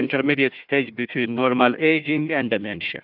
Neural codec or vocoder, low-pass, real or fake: codec, 16 kHz, 1 kbps, FunCodec, trained on Chinese and English, 50 frames a second; 5.4 kHz; fake